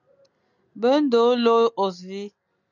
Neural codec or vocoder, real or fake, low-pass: none; real; 7.2 kHz